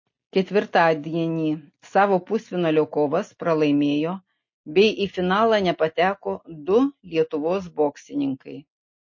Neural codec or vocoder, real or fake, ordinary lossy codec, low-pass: none; real; MP3, 32 kbps; 7.2 kHz